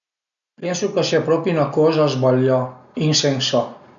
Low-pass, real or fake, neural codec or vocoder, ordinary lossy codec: 7.2 kHz; real; none; none